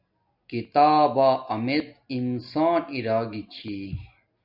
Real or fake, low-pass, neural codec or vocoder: real; 5.4 kHz; none